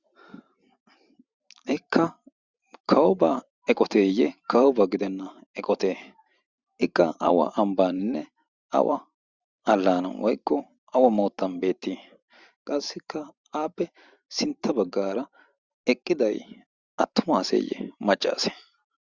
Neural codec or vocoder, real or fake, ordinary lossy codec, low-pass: vocoder, 44.1 kHz, 128 mel bands every 512 samples, BigVGAN v2; fake; Opus, 64 kbps; 7.2 kHz